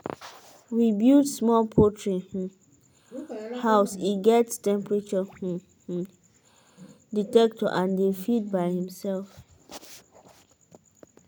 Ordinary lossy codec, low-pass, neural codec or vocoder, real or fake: none; none; none; real